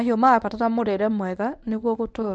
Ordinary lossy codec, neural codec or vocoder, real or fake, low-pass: none; codec, 24 kHz, 0.9 kbps, WavTokenizer, medium speech release version 1; fake; 9.9 kHz